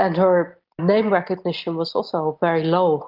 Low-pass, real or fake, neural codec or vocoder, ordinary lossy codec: 5.4 kHz; real; none; Opus, 32 kbps